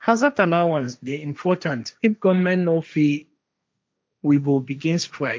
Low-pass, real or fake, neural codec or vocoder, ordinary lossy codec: none; fake; codec, 16 kHz, 1.1 kbps, Voila-Tokenizer; none